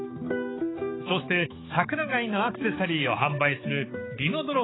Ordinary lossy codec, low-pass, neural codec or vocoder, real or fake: AAC, 16 kbps; 7.2 kHz; codec, 16 kHz, 4 kbps, X-Codec, HuBERT features, trained on balanced general audio; fake